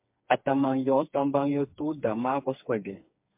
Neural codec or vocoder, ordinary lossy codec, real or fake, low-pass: codec, 16 kHz, 4 kbps, FreqCodec, smaller model; MP3, 32 kbps; fake; 3.6 kHz